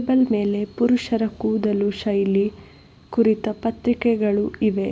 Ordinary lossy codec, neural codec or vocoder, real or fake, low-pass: none; none; real; none